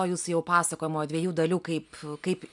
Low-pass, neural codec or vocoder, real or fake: 10.8 kHz; none; real